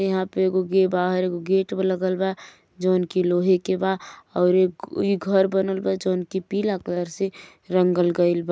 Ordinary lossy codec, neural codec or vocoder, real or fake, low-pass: none; none; real; none